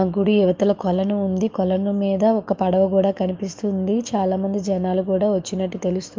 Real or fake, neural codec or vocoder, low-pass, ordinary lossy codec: fake; autoencoder, 48 kHz, 128 numbers a frame, DAC-VAE, trained on Japanese speech; 7.2 kHz; Opus, 24 kbps